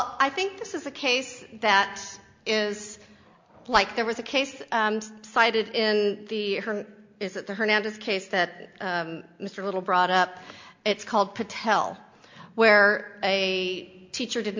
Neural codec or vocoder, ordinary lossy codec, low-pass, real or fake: none; MP3, 48 kbps; 7.2 kHz; real